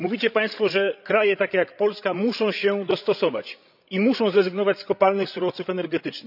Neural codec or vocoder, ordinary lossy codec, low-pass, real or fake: codec, 16 kHz, 16 kbps, FreqCodec, larger model; none; 5.4 kHz; fake